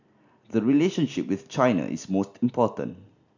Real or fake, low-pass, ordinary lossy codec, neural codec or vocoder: real; 7.2 kHz; none; none